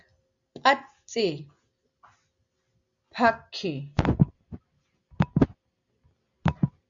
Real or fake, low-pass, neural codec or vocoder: real; 7.2 kHz; none